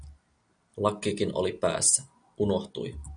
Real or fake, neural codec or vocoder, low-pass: real; none; 9.9 kHz